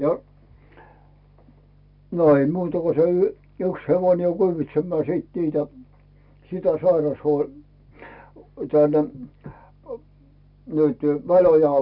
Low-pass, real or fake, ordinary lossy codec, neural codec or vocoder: 5.4 kHz; real; none; none